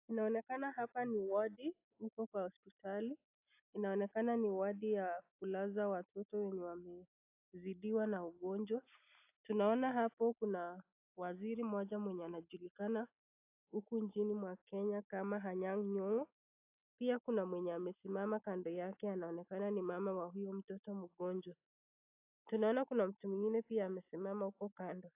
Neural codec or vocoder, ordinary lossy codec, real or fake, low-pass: none; AAC, 32 kbps; real; 3.6 kHz